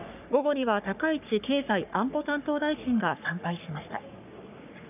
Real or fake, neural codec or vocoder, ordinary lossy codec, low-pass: fake; codec, 44.1 kHz, 3.4 kbps, Pupu-Codec; none; 3.6 kHz